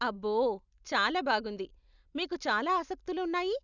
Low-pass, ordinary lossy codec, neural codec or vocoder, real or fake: 7.2 kHz; none; none; real